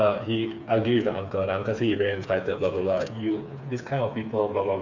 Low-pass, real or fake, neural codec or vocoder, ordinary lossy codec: 7.2 kHz; fake; codec, 16 kHz, 4 kbps, FreqCodec, smaller model; none